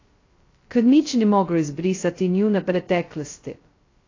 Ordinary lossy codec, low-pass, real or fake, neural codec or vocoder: AAC, 32 kbps; 7.2 kHz; fake; codec, 16 kHz, 0.2 kbps, FocalCodec